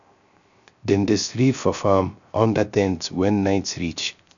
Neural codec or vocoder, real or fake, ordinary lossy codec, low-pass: codec, 16 kHz, 0.3 kbps, FocalCodec; fake; none; 7.2 kHz